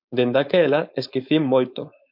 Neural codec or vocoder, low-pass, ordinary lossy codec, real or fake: codec, 16 kHz, 4.8 kbps, FACodec; 5.4 kHz; MP3, 48 kbps; fake